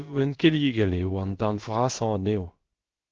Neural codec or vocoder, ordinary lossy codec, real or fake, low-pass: codec, 16 kHz, about 1 kbps, DyCAST, with the encoder's durations; Opus, 16 kbps; fake; 7.2 kHz